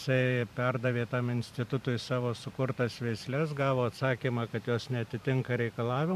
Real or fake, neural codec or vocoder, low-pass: fake; vocoder, 44.1 kHz, 128 mel bands every 512 samples, BigVGAN v2; 14.4 kHz